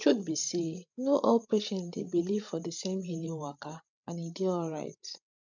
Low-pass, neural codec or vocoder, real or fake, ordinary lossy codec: 7.2 kHz; codec, 16 kHz, 8 kbps, FreqCodec, larger model; fake; none